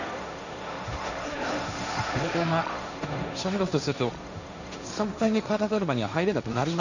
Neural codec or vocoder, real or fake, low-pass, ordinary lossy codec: codec, 16 kHz, 1.1 kbps, Voila-Tokenizer; fake; 7.2 kHz; none